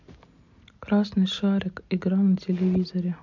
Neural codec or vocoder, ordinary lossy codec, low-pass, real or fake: none; MP3, 48 kbps; 7.2 kHz; real